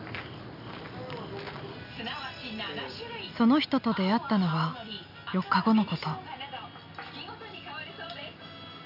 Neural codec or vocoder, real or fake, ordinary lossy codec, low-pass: none; real; none; 5.4 kHz